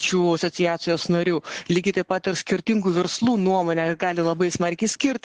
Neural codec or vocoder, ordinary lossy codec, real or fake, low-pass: codec, 44.1 kHz, 7.8 kbps, Pupu-Codec; Opus, 16 kbps; fake; 10.8 kHz